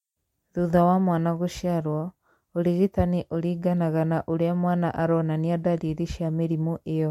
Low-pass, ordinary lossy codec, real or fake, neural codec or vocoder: 19.8 kHz; MP3, 64 kbps; real; none